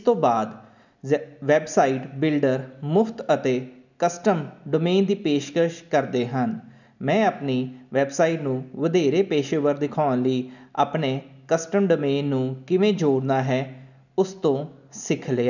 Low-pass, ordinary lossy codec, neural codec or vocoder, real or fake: 7.2 kHz; none; none; real